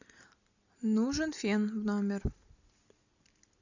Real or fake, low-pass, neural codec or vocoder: real; 7.2 kHz; none